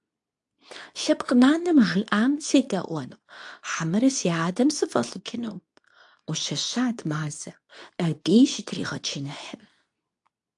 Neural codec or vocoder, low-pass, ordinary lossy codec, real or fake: codec, 24 kHz, 0.9 kbps, WavTokenizer, medium speech release version 2; 10.8 kHz; AAC, 64 kbps; fake